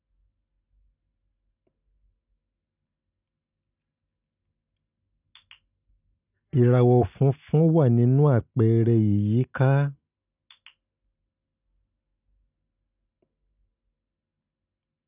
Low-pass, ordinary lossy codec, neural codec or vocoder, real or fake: 3.6 kHz; none; none; real